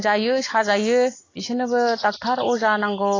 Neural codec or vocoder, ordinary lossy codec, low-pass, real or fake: none; AAC, 32 kbps; 7.2 kHz; real